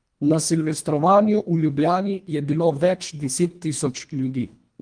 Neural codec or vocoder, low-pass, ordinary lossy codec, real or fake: codec, 24 kHz, 1.5 kbps, HILCodec; 9.9 kHz; Opus, 32 kbps; fake